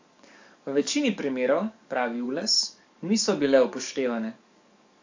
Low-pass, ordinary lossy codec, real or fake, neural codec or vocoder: 7.2 kHz; AAC, 48 kbps; fake; codec, 44.1 kHz, 7.8 kbps, DAC